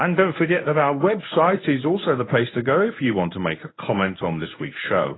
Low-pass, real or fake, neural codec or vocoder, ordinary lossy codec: 7.2 kHz; fake; codec, 24 kHz, 0.9 kbps, WavTokenizer, medium speech release version 1; AAC, 16 kbps